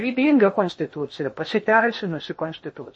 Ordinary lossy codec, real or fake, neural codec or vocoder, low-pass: MP3, 32 kbps; fake; codec, 16 kHz in and 24 kHz out, 0.8 kbps, FocalCodec, streaming, 65536 codes; 9.9 kHz